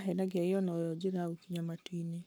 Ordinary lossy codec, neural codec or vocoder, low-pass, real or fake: none; codec, 44.1 kHz, 7.8 kbps, Pupu-Codec; none; fake